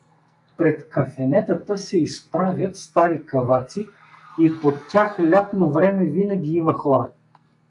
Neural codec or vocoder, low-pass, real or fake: codec, 44.1 kHz, 2.6 kbps, SNAC; 10.8 kHz; fake